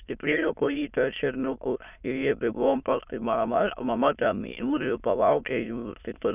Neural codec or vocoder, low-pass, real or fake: autoencoder, 22.05 kHz, a latent of 192 numbers a frame, VITS, trained on many speakers; 3.6 kHz; fake